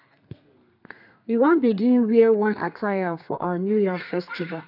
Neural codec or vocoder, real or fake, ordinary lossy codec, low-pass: codec, 32 kHz, 1.9 kbps, SNAC; fake; none; 5.4 kHz